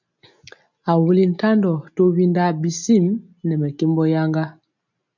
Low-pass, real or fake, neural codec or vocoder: 7.2 kHz; real; none